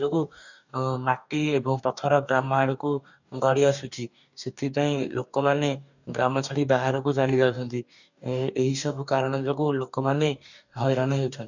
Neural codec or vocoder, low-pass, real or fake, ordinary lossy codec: codec, 44.1 kHz, 2.6 kbps, DAC; 7.2 kHz; fake; none